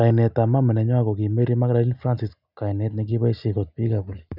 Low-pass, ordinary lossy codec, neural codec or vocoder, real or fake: 5.4 kHz; none; none; real